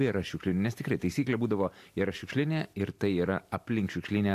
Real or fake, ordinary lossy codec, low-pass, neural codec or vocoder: real; AAC, 64 kbps; 14.4 kHz; none